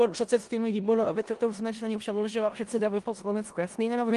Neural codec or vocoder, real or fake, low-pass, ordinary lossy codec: codec, 16 kHz in and 24 kHz out, 0.4 kbps, LongCat-Audio-Codec, four codebook decoder; fake; 10.8 kHz; Opus, 24 kbps